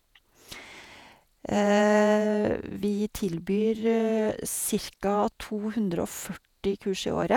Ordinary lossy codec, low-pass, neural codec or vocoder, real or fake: none; 19.8 kHz; vocoder, 48 kHz, 128 mel bands, Vocos; fake